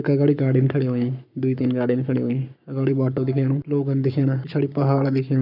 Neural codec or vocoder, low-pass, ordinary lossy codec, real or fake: codec, 44.1 kHz, 7.8 kbps, Pupu-Codec; 5.4 kHz; none; fake